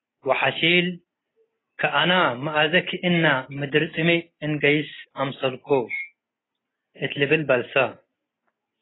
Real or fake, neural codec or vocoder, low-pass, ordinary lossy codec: real; none; 7.2 kHz; AAC, 16 kbps